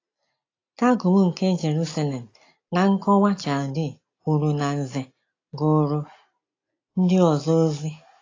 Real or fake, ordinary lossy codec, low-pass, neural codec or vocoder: real; AAC, 32 kbps; 7.2 kHz; none